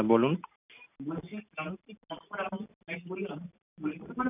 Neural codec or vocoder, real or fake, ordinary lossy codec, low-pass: none; real; none; 3.6 kHz